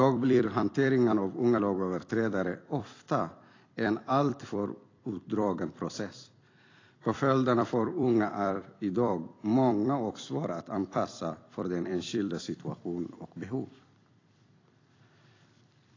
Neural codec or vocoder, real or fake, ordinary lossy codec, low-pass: vocoder, 44.1 kHz, 128 mel bands every 256 samples, BigVGAN v2; fake; AAC, 32 kbps; 7.2 kHz